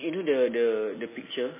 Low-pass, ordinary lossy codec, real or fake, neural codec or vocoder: 3.6 kHz; MP3, 16 kbps; real; none